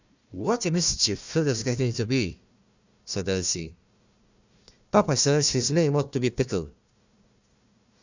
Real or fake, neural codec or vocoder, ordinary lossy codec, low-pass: fake; codec, 16 kHz, 1 kbps, FunCodec, trained on Chinese and English, 50 frames a second; Opus, 64 kbps; 7.2 kHz